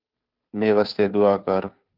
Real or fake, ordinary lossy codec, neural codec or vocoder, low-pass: fake; Opus, 32 kbps; codec, 16 kHz, 2 kbps, FunCodec, trained on Chinese and English, 25 frames a second; 5.4 kHz